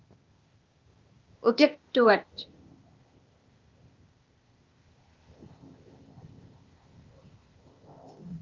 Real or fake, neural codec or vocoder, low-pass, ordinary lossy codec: fake; codec, 16 kHz, 0.8 kbps, ZipCodec; 7.2 kHz; Opus, 32 kbps